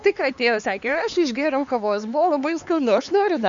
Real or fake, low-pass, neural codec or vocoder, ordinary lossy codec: fake; 7.2 kHz; codec, 16 kHz, 4 kbps, X-Codec, HuBERT features, trained on LibriSpeech; Opus, 64 kbps